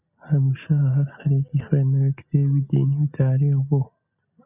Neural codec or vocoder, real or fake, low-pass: none; real; 3.6 kHz